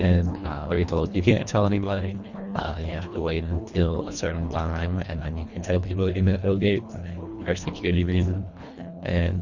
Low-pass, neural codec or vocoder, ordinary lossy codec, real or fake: 7.2 kHz; codec, 24 kHz, 1.5 kbps, HILCodec; Opus, 64 kbps; fake